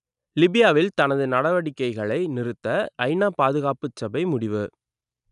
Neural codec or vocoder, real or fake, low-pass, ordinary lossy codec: none; real; 10.8 kHz; none